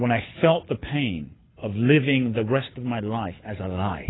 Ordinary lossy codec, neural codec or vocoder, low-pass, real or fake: AAC, 16 kbps; codec, 24 kHz, 6 kbps, HILCodec; 7.2 kHz; fake